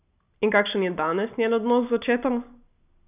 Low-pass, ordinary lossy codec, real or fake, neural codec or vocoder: 3.6 kHz; none; real; none